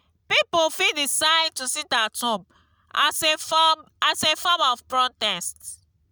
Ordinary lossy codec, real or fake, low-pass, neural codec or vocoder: none; real; none; none